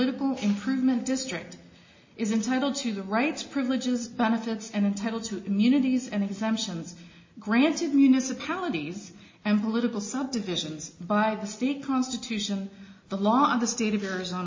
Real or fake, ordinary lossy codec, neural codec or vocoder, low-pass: real; MP3, 32 kbps; none; 7.2 kHz